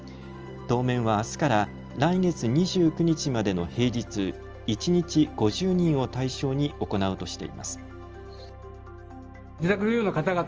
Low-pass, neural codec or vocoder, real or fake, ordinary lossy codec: 7.2 kHz; none; real; Opus, 24 kbps